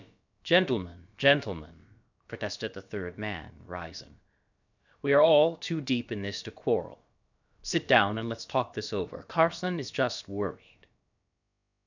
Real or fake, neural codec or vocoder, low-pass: fake; codec, 16 kHz, about 1 kbps, DyCAST, with the encoder's durations; 7.2 kHz